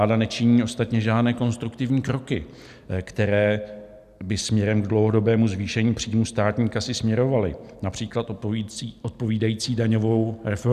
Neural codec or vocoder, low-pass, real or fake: none; 14.4 kHz; real